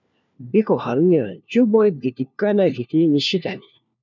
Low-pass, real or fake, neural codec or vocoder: 7.2 kHz; fake; codec, 16 kHz, 1 kbps, FunCodec, trained on LibriTTS, 50 frames a second